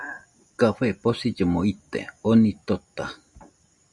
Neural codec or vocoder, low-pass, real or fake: none; 10.8 kHz; real